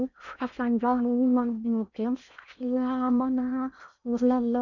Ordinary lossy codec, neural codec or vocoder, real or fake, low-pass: none; codec, 16 kHz in and 24 kHz out, 0.6 kbps, FocalCodec, streaming, 4096 codes; fake; 7.2 kHz